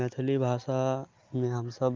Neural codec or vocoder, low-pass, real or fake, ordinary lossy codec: none; 7.2 kHz; real; Opus, 24 kbps